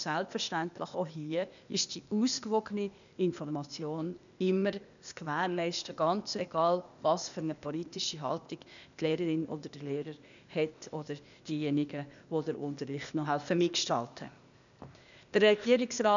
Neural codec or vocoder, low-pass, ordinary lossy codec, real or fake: codec, 16 kHz, 0.8 kbps, ZipCodec; 7.2 kHz; none; fake